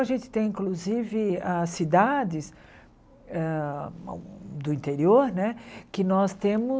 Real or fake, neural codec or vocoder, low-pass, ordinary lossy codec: real; none; none; none